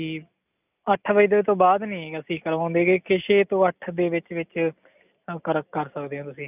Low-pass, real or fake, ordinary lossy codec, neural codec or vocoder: 3.6 kHz; real; none; none